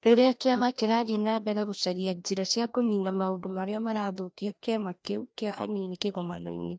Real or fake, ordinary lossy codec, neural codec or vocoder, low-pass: fake; none; codec, 16 kHz, 1 kbps, FreqCodec, larger model; none